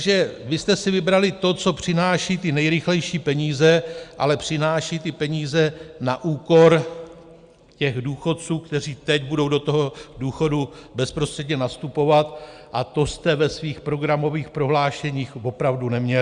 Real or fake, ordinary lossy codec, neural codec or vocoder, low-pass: real; Opus, 64 kbps; none; 9.9 kHz